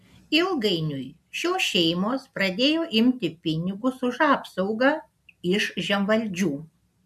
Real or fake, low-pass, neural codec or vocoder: real; 14.4 kHz; none